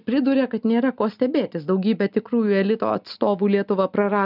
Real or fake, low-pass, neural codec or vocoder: real; 5.4 kHz; none